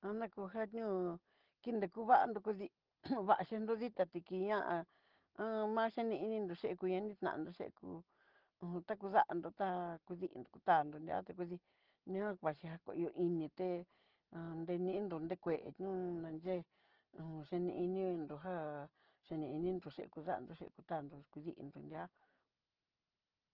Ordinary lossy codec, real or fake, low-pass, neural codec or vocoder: Opus, 16 kbps; real; 5.4 kHz; none